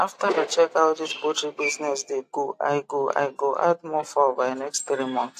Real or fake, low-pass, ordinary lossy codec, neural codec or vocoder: real; 14.4 kHz; AAC, 64 kbps; none